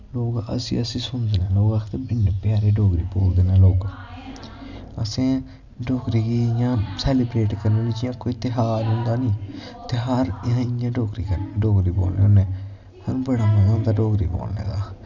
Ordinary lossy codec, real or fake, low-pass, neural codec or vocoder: none; real; 7.2 kHz; none